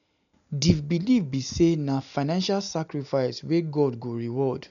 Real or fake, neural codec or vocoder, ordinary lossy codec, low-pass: real; none; none; 7.2 kHz